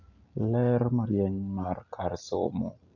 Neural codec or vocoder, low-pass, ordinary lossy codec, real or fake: none; 7.2 kHz; none; real